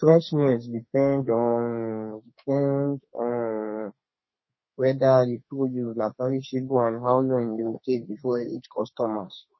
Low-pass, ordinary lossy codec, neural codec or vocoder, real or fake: 7.2 kHz; MP3, 24 kbps; codec, 32 kHz, 1.9 kbps, SNAC; fake